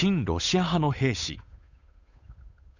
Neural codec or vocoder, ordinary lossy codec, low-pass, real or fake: codec, 16 kHz, 8 kbps, FunCodec, trained on Chinese and English, 25 frames a second; none; 7.2 kHz; fake